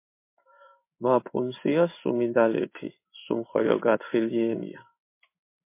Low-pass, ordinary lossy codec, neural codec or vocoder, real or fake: 3.6 kHz; MP3, 24 kbps; codec, 16 kHz in and 24 kHz out, 1 kbps, XY-Tokenizer; fake